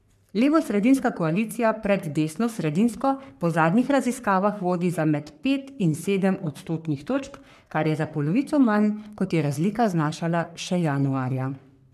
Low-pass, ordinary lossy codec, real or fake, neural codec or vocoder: 14.4 kHz; none; fake; codec, 44.1 kHz, 3.4 kbps, Pupu-Codec